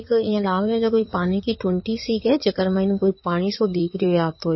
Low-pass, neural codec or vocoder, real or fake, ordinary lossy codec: 7.2 kHz; codec, 16 kHz in and 24 kHz out, 2.2 kbps, FireRedTTS-2 codec; fake; MP3, 24 kbps